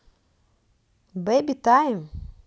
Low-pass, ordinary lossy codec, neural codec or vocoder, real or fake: none; none; none; real